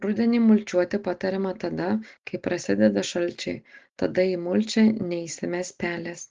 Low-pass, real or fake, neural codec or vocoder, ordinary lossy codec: 7.2 kHz; real; none; Opus, 16 kbps